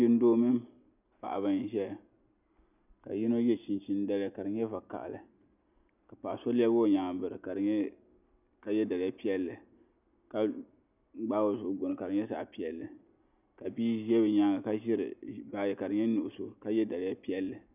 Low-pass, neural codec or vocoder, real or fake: 3.6 kHz; none; real